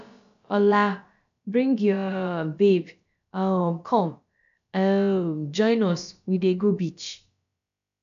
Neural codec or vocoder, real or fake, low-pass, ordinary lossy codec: codec, 16 kHz, about 1 kbps, DyCAST, with the encoder's durations; fake; 7.2 kHz; AAC, 96 kbps